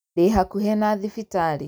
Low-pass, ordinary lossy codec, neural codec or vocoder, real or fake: none; none; none; real